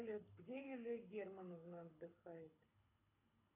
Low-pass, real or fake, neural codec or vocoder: 3.6 kHz; fake; codec, 24 kHz, 6 kbps, HILCodec